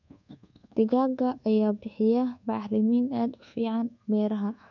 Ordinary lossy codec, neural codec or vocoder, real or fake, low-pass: none; codec, 24 kHz, 1.2 kbps, DualCodec; fake; 7.2 kHz